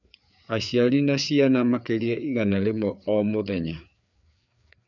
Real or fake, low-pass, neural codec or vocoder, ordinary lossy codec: fake; 7.2 kHz; codec, 16 kHz, 4 kbps, FreqCodec, larger model; none